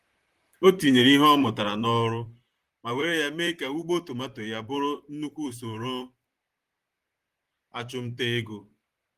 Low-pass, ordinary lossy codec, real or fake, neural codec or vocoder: 14.4 kHz; Opus, 24 kbps; fake; vocoder, 44.1 kHz, 128 mel bands, Pupu-Vocoder